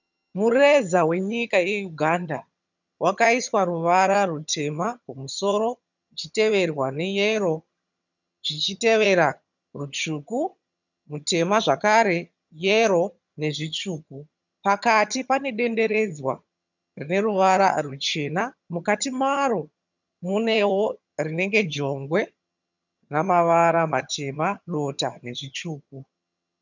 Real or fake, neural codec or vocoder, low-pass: fake; vocoder, 22.05 kHz, 80 mel bands, HiFi-GAN; 7.2 kHz